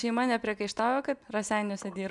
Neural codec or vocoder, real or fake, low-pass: none; real; 10.8 kHz